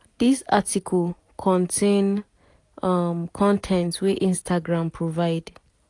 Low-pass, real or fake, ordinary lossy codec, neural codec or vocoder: 10.8 kHz; real; AAC, 48 kbps; none